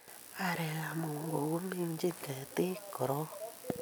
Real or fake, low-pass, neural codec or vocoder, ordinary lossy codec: real; none; none; none